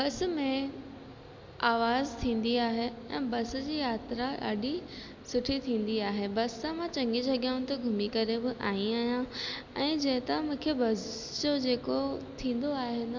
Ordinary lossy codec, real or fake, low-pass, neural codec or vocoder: MP3, 64 kbps; real; 7.2 kHz; none